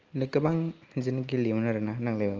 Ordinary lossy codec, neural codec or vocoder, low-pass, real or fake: Opus, 24 kbps; none; 7.2 kHz; real